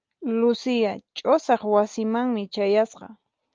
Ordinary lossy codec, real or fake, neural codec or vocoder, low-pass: Opus, 24 kbps; real; none; 7.2 kHz